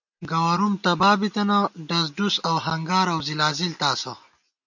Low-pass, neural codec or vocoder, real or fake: 7.2 kHz; none; real